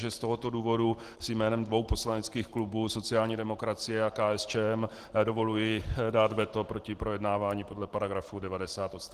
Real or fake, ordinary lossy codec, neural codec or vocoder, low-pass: real; Opus, 16 kbps; none; 14.4 kHz